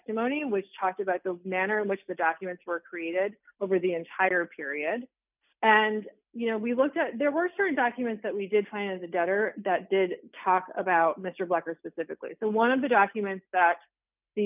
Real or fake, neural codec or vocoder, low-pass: real; none; 3.6 kHz